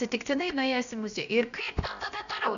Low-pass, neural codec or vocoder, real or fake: 7.2 kHz; codec, 16 kHz, 0.7 kbps, FocalCodec; fake